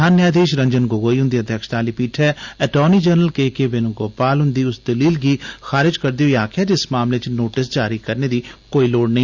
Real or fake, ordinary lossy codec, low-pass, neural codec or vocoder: real; Opus, 64 kbps; 7.2 kHz; none